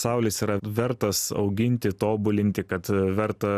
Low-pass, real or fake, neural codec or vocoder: 14.4 kHz; real; none